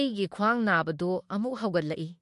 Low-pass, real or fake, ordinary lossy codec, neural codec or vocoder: 14.4 kHz; fake; MP3, 48 kbps; autoencoder, 48 kHz, 32 numbers a frame, DAC-VAE, trained on Japanese speech